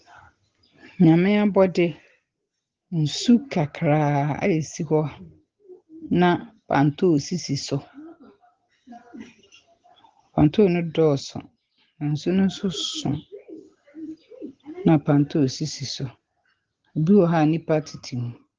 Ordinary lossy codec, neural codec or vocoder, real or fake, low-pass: Opus, 16 kbps; none; real; 7.2 kHz